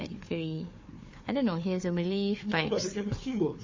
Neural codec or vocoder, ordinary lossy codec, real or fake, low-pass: codec, 16 kHz, 4 kbps, FunCodec, trained on Chinese and English, 50 frames a second; MP3, 32 kbps; fake; 7.2 kHz